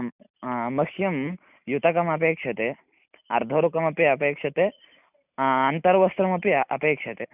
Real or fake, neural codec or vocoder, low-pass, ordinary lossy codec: real; none; 3.6 kHz; none